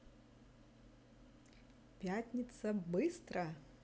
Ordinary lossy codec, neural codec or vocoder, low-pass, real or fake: none; none; none; real